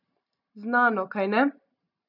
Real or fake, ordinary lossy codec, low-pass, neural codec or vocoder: real; none; 5.4 kHz; none